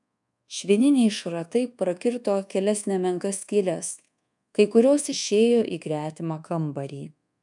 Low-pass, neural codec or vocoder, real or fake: 10.8 kHz; codec, 24 kHz, 1.2 kbps, DualCodec; fake